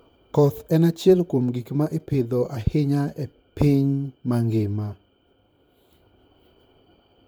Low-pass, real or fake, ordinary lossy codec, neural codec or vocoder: none; fake; none; vocoder, 44.1 kHz, 128 mel bands, Pupu-Vocoder